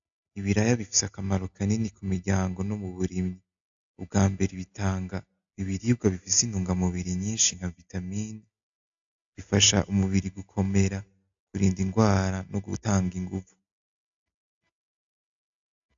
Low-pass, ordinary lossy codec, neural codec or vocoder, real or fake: 7.2 kHz; AAC, 64 kbps; none; real